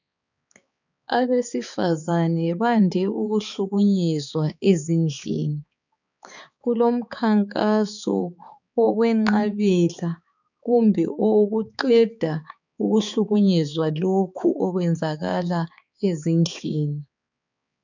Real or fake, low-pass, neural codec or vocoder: fake; 7.2 kHz; codec, 16 kHz, 4 kbps, X-Codec, HuBERT features, trained on balanced general audio